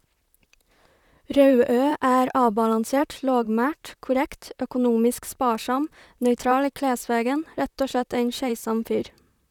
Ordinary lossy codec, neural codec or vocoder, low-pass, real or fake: none; vocoder, 44.1 kHz, 128 mel bands, Pupu-Vocoder; 19.8 kHz; fake